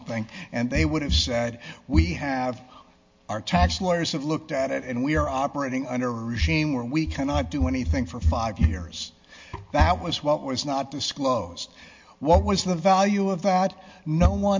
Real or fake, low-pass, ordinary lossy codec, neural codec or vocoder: real; 7.2 kHz; MP3, 48 kbps; none